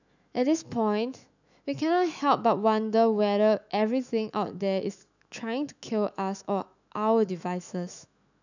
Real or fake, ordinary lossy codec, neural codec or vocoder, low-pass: fake; none; autoencoder, 48 kHz, 128 numbers a frame, DAC-VAE, trained on Japanese speech; 7.2 kHz